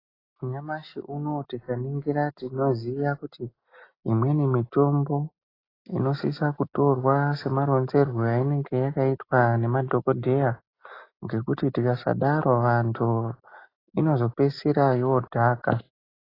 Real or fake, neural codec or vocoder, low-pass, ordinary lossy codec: real; none; 5.4 kHz; AAC, 24 kbps